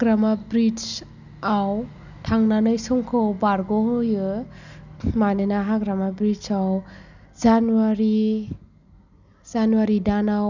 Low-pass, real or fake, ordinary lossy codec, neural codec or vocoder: 7.2 kHz; real; none; none